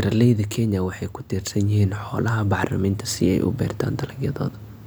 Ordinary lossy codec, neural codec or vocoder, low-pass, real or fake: none; none; none; real